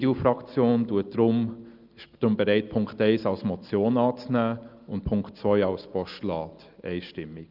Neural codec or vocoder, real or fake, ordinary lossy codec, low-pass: none; real; Opus, 24 kbps; 5.4 kHz